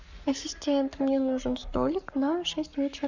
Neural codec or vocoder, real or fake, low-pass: codec, 44.1 kHz, 7.8 kbps, Pupu-Codec; fake; 7.2 kHz